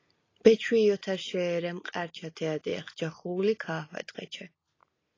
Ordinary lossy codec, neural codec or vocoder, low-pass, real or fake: AAC, 32 kbps; none; 7.2 kHz; real